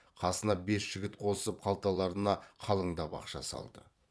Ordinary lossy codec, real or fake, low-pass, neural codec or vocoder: none; fake; none; vocoder, 22.05 kHz, 80 mel bands, Vocos